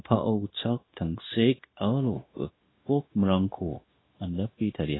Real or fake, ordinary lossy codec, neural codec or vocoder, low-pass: fake; AAC, 16 kbps; codec, 16 kHz, 0.9 kbps, LongCat-Audio-Codec; 7.2 kHz